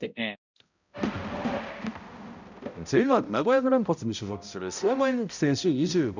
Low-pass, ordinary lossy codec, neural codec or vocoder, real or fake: 7.2 kHz; none; codec, 16 kHz, 0.5 kbps, X-Codec, HuBERT features, trained on balanced general audio; fake